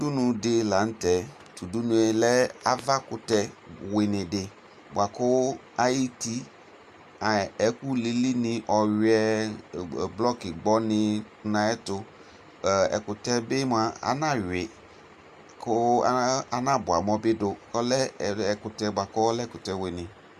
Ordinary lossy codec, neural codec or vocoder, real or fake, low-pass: Opus, 64 kbps; none; real; 14.4 kHz